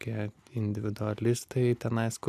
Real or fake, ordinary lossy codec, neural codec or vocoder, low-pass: real; MP3, 96 kbps; none; 14.4 kHz